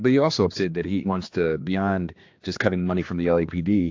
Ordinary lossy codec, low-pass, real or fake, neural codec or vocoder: AAC, 48 kbps; 7.2 kHz; fake; codec, 16 kHz, 2 kbps, X-Codec, HuBERT features, trained on general audio